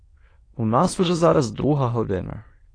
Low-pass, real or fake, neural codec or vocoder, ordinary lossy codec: 9.9 kHz; fake; autoencoder, 22.05 kHz, a latent of 192 numbers a frame, VITS, trained on many speakers; AAC, 32 kbps